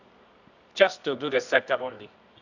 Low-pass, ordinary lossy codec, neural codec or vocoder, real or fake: 7.2 kHz; none; codec, 24 kHz, 0.9 kbps, WavTokenizer, medium music audio release; fake